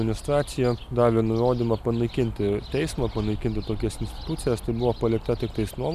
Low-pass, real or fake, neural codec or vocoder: 14.4 kHz; real; none